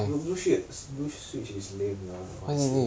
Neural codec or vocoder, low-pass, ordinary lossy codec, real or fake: none; none; none; real